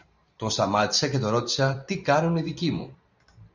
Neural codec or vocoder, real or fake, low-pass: vocoder, 44.1 kHz, 128 mel bands every 512 samples, BigVGAN v2; fake; 7.2 kHz